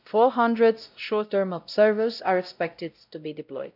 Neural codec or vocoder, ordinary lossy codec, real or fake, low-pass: codec, 16 kHz, 0.5 kbps, X-Codec, WavLM features, trained on Multilingual LibriSpeech; none; fake; 5.4 kHz